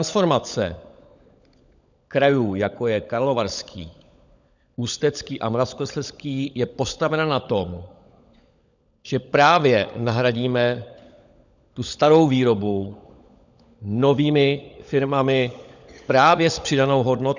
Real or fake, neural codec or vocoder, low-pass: fake; codec, 16 kHz, 16 kbps, FunCodec, trained on LibriTTS, 50 frames a second; 7.2 kHz